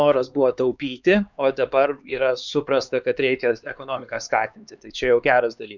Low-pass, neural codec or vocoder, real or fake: 7.2 kHz; codec, 16 kHz, 4 kbps, X-Codec, HuBERT features, trained on LibriSpeech; fake